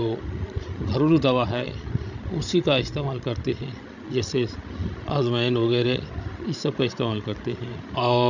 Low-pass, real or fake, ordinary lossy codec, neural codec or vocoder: 7.2 kHz; fake; none; codec, 16 kHz, 16 kbps, FreqCodec, larger model